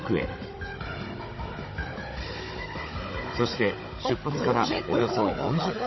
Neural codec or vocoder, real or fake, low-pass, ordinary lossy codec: codec, 16 kHz, 16 kbps, FunCodec, trained on Chinese and English, 50 frames a second; fake; 7.2 kHz; MP3, 24 kbps